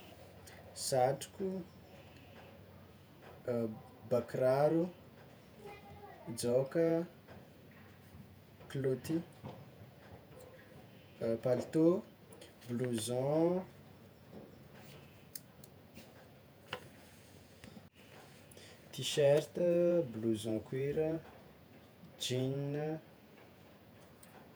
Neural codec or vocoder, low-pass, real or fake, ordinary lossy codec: vocoder, 48 kHz, 128 mel bands, Vocos; none; fake; none